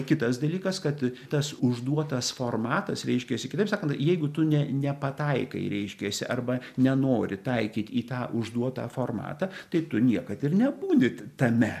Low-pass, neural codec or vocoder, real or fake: 14.4 kHz; none; real